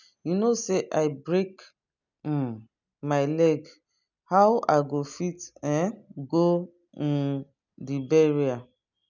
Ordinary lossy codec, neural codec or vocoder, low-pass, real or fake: none; none; 7.2 kHz; real